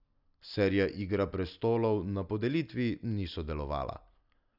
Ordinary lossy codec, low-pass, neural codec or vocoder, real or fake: none; 5.4 kHz; none; real